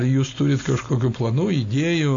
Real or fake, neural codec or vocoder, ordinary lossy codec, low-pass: real; none; AAC, 48 kbps; 7.2 kHz